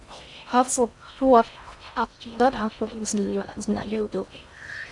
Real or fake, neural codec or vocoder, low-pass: fake; codec, 16 kHz in and 24 kHz out, 0.6 kbps, FocalCodec, streaming, 2048 codes; 10.8 kHz